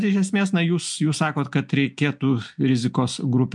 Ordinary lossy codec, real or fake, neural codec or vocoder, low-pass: MP3, 64 kbps; real; none; 10.8 kHz